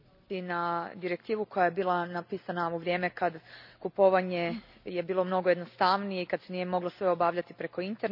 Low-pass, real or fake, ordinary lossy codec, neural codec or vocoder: 5.4 kHz; real; none; none